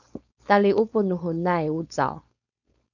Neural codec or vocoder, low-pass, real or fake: codec, 16 kHz, 4.8 kbps, FACodec; 7.2 kHz; fake